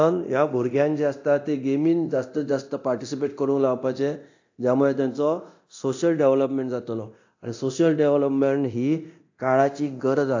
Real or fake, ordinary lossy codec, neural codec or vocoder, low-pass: fake; AAC, 48 kbps; codec, 24 kHz, 0.9 kbps, DualCodec; 7.2 kHz